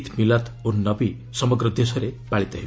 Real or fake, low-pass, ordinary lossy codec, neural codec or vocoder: real; none; none; none